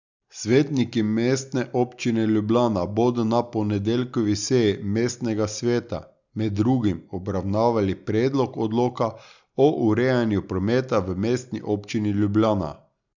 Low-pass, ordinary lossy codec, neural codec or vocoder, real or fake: 7.2 kHz; none; none; real